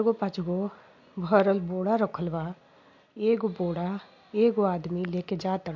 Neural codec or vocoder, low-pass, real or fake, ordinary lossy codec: none; 7.2 kHz; real; MP3, 48 kbps